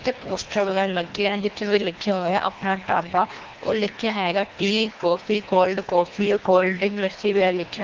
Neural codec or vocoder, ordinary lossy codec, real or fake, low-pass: codec, 24 kHz, 1.5 kbps, HILCodec; Opus, 32 kbps; fake; 7.2 kHz